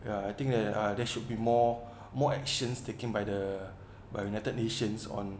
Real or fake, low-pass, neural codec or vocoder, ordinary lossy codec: real; none; none; none